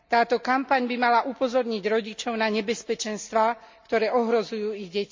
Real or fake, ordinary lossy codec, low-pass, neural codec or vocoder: real; MP3, 64 kbps; 7.2 kHz; none